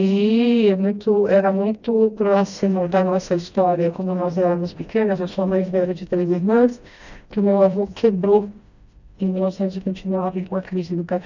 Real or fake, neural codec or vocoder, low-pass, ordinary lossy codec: fake; codec, 16 kHz, 1 kbps, FreqCodec, smaller model; 7.2 kHz; none